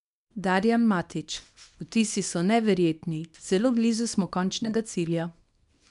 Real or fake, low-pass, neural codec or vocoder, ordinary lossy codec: fake; 10.8 kHz; codec, 24 kHz, 0.9 kbps, WavTokenizer, small release; none